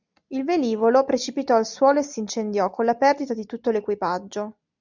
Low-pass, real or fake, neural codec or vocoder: 7.2 kHz; real; none